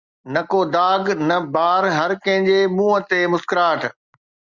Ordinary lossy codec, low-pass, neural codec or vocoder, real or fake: Opus, 64 kbps; 7.2 kHz; none; real